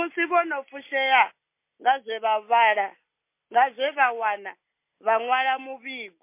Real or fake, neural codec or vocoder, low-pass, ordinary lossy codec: real; none; 3.6 kHz; MP3, 24 kbps